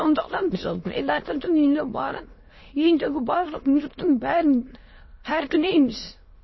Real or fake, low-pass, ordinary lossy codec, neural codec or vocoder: fake; 7.2 kHz; MP3, 24 kbps; autoencoder, 22.05 kHz, a latent of 192 numbers a frame, VITS, trained on many speakers